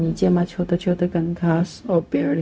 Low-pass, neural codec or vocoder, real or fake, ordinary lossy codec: none; codec, 16 kHz, 0.4 kbps, LongCat-Audio-Codec; fake; none